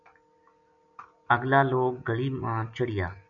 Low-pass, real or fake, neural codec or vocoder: 7.2 kHz; real; none